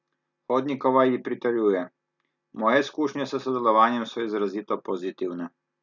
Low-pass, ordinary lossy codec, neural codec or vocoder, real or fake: 7.2 kHz; none; none; real